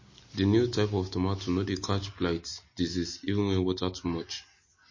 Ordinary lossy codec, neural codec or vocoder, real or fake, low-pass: MP3, 32 kbps; none; real; 7.2 kHz